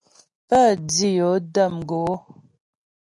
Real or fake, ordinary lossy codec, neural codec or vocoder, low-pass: real; AAC, 64 kbps; none; 10.8 kHz